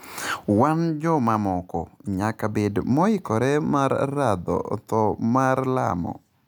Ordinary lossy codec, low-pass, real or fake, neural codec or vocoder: none; none; real; none